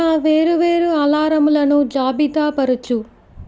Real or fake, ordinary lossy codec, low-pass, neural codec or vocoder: real; none; none; none